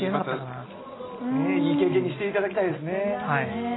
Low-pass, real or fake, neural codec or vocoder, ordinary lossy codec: 7.2 kHz; real; none; AAC, 16 kbps